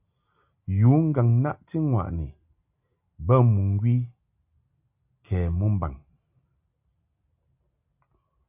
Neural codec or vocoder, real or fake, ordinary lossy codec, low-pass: none; real; AAC, 32 kbps; 3.6 kHz